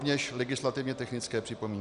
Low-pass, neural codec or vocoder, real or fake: 10.8 kHz; none; real